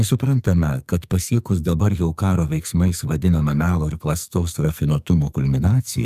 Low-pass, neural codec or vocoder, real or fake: 14.4 kHz; codec, 44.1 kHz, 2.6 kbps, SNAC; fake